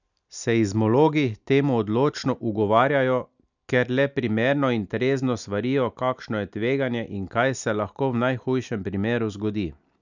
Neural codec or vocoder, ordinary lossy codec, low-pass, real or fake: none; none; 7.2 kHz; real